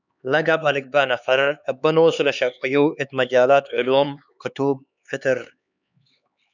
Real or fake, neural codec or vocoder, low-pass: fake; codec, 16 kHz, 4 kbps, X-Codec, HuBERT features, trained on LibriSpeech; 7.2 kHz